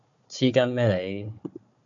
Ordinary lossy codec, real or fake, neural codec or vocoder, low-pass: MP3, 64 kbps; fake; codec, 16 kHz, 4 kbps, FunCodec, trained on Chinese and English, 50 frames a second; 7.2 kHz